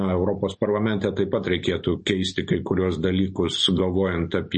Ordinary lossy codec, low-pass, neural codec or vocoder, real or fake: MP3, 32 kbps; 10.8 kHz; none; real